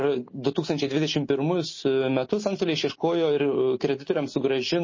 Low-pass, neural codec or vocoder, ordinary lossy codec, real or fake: 7.2 kHz; none; MP3, 32 kbps; real